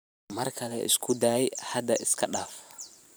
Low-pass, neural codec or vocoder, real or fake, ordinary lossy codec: none; vocoder, 44.1 kHz, 128 mel bands every 512 samples, BigVGAN v2; fake; none